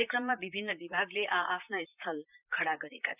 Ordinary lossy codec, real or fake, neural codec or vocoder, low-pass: none; fake; vocoder, 44.1 kHz, 128 mel bands, Pupu-Vocoder; 3.6 kHz